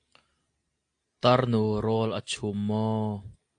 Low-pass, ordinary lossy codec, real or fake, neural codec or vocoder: 9.9 kHz; AAC, 48 kbps; real; none